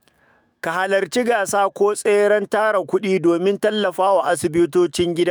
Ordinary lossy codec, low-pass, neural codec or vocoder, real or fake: none; none; autoencoder, 48 kHz, 128 numbers a frame, DAC-VAE, trained on Japanese speech; fake